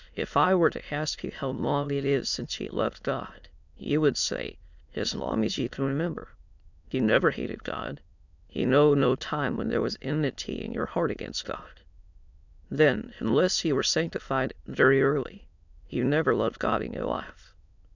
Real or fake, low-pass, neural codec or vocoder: fake; 7.2 kHz; autoencoder, 22.05 kHz, a latent of 192 numbers a frame, VITS, trained on many speakers